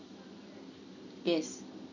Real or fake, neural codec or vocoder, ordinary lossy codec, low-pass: real; none; none; 7.2 kHz